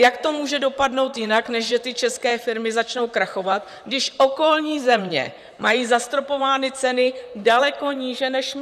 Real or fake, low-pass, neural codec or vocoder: fake; 14.4 kHz; vocoder, 44.1 kHz, 128 mel bands, Pupu-Vocoder